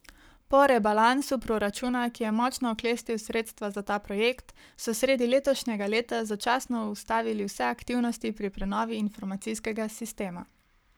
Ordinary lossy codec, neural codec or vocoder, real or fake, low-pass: none; codec, 44.1 kHz, 7.8 kbps, Pupu-Codec; fake; none